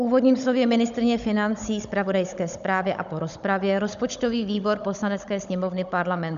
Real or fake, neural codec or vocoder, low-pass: fake; codec, 16 kHz, 16 kbps, FunCodec, trained on Chinese and English, 50 frames a second; 7.2 kHz